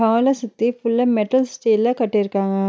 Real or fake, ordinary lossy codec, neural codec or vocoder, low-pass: real; none; none; none